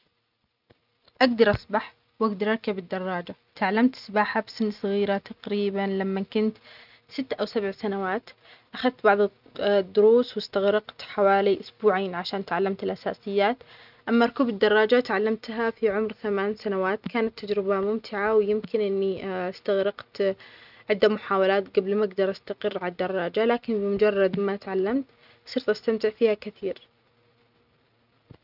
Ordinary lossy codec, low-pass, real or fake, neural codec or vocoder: none; 5.4 kHz; real; none